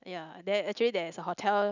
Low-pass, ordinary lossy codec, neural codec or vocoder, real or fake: 7.2 kHz; none; none; real